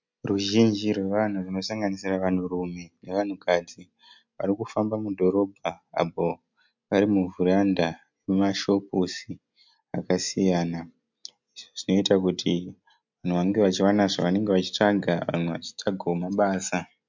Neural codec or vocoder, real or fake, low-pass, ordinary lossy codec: none; real; 7.2 kHz; MP3, 64 kbps